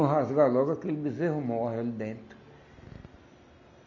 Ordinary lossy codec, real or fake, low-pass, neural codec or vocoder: none; real; 7.2 kHz; none